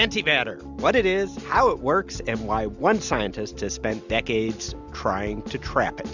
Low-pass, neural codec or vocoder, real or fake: 7.2 kHz; none; real